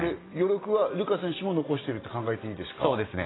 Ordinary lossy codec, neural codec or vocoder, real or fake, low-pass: AAC, 16 kbps; none; real; 7.2 kHz